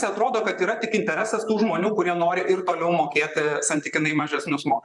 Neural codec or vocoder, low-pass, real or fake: vocoder, 44.1 kHz, 128 mel bands, Pupu-Vocoder; 10.8 kHz; fake